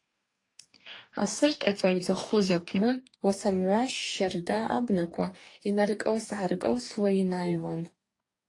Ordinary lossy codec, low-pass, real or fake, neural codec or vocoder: AAC, 48 kbps; 10.8 kHz; fake; codec, 44.1 kHz, 2.6 kbps, DAC